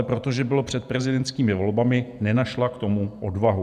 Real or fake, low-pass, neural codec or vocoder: fake; 14.4 kHz; vocoder, 48 kHz, 128 mel bands, Vocos